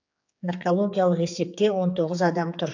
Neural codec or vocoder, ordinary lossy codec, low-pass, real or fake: codec, 16 kHz, 4 kbps, X-Codec, HuBERT features, trained on general audio; AAC, 48 kbps; 7.2 kHz; fake